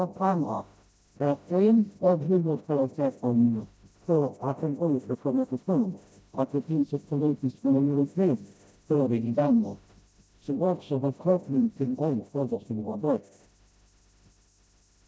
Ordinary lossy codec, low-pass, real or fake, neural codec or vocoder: none; none; fake; codec, 16 kHz, 0.5 kbps, FreqCodec, smaller model